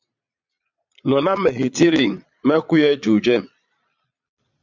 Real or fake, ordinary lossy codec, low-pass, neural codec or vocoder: fake; MP3, 64 kbps; 7.2 kHz; vocoder, 22.05 kHz, 80 mel bands, Vocos